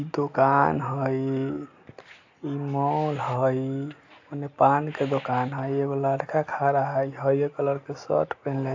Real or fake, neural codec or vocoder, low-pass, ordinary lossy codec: real; none; 7.2 kHz; AAC, 48 kbps